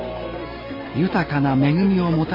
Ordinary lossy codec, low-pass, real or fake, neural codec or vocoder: MP3, 24 kbps; 5.4 kHz; fake; vocoder, 44.1 kHz, 128 mel bands every 256 samples, BigVGAN v2